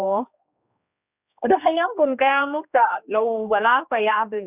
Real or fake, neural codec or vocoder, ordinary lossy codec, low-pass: fake; codec, 16 kHz, 1 kbps, X-Codec, HuBERT features, trained on general audio; none; 3.6 kHz